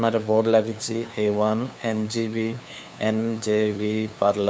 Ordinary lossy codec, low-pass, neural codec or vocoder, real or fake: none; none; codec, 16 kHz, 2 kbps, FunCodec, trained on LibriTTS, 25 frames a second; fake